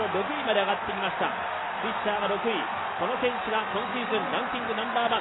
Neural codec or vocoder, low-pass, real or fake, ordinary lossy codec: none; 7.2 kHz; real; AAC, 16 kbps